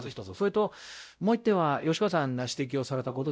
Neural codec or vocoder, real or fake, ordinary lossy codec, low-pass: codec, 16 kHz, 0.5 kbps, X-Codec, WavLM features, trained on Multilingual LibriSpeech; fake; none; none